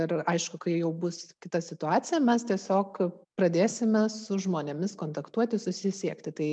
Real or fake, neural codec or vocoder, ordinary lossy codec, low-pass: real; none; MP3, 96 kbps; 9.9 kHz